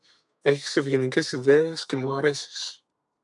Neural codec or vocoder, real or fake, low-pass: codec, 32 kHz, 1.9 kbps, SNAC; fake; 10.8 kHz